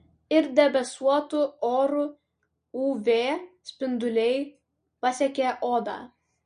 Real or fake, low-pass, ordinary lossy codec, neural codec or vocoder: real; 9.9 kHz; MP3, 48 kbps; none